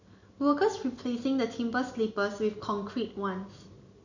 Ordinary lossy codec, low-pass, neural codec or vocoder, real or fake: none; 7.2 kHz; none; real